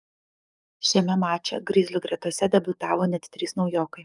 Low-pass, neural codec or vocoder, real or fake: 10.8 kHz; codec, 44.1 kHz, 7.8 kbps, DAC; fake